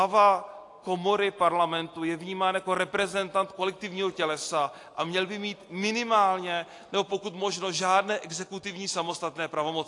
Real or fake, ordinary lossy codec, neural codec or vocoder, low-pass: real; AAC, 48 kbps; none; 10.8 kHz